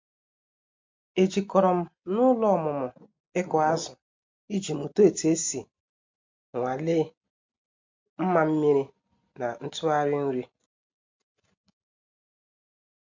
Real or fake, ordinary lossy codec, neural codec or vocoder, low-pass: real; MP3, 48 kbps; none; 7.2 kHz